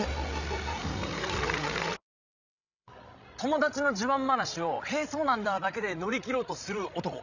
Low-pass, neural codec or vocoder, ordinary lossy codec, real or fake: 7.2 kHz; codec, 16 kHz, 16 kbps, FreqCodec, larger model; none; fake